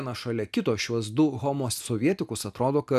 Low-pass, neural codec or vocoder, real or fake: 14.4 kHz; none; real